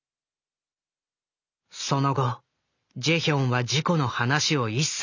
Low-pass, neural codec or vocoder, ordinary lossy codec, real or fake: 7.2 kHz; none; none; real